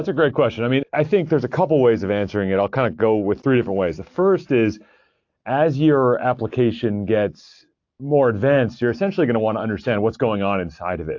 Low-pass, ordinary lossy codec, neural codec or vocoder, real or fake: 7.2 kHz; AAC, 48 kbps; none; real